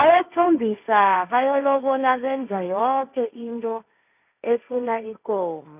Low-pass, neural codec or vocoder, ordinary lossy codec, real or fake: 3.6 kHz; codec, 16 kHz, 1.1 kbps, Voila-Tokenizer; none; fake